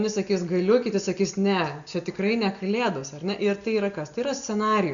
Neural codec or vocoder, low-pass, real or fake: none; 7.2 kHz; real